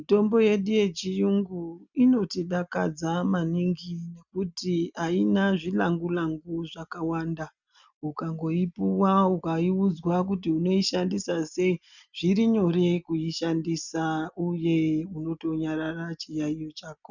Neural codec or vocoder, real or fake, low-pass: none; real; 7.2 kHz